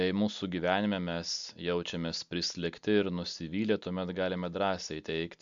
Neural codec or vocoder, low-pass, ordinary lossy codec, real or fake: none; 7.2 kHz; MP3, 64 kbps; real